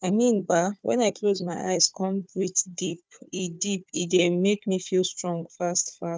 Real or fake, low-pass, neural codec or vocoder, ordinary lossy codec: fake; none; codec, 16 kHz, 4 kbps, FunCodec, trained on Chinese and English, 50 frames a second; none